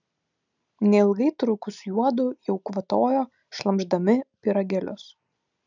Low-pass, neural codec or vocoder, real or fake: 7.2 kHz; none; real